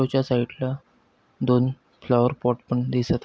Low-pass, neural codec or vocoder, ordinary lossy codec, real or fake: none; none; none; real